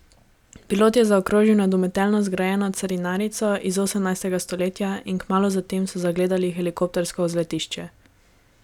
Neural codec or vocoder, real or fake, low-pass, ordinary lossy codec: none; real; 19.8 kHz; none